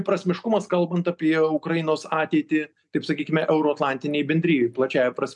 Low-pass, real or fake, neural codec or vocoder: 10.8 kHz; real; none